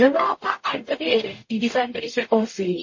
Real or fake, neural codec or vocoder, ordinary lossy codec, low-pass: fake; codec, 44.1 kHz, 0.9 kbps, DAC; MP3, 32 kbps; 7.2 kHz